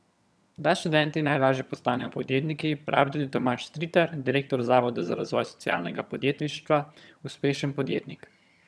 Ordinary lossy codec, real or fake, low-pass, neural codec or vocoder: none; fake; none; vocoder, 22.05 kHz, 80 mel bands, HiFi-GAN